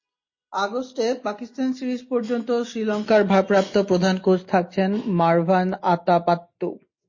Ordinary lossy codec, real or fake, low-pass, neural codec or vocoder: MP3, 32 kbps; real; 7.2 kHz; none